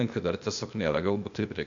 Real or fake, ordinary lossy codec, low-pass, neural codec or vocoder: fake; MP3, 64 kbps; 7.2 kHz; codec, 16 kHz, 0.8 kbps, ZipCodec